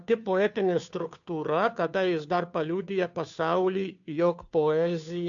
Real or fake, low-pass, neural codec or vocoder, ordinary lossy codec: fake; 7.2 kHz; codec, 16 kHz, 2 kbps, FunCodec, trained on Chinese and English, 25 frames a second; MP3, 96 kbps